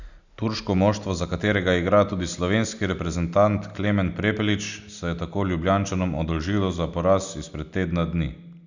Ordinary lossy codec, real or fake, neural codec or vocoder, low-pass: none; real; none; 7.2 kHz